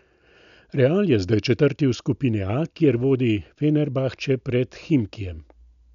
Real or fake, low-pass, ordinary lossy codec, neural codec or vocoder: real; 7.2 kHz; none; none